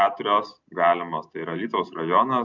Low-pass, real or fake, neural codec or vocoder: 7.2 kHz; real; none